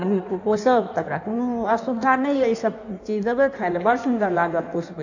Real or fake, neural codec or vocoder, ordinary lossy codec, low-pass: fake; codec, 16 kHz in and 24 kHz out, 1.1 kbps, FireRedTTS-2 codec; none; 7.2 kHz